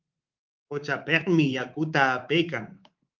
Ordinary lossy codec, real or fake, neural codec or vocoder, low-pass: Opus, 32 kbps; fake; codec, 24 kHz, 3.1 kbps, DualCodec; 7.2 kHz